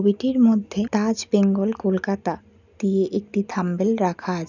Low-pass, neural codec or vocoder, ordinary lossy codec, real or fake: 7.2 kHz; none; none; real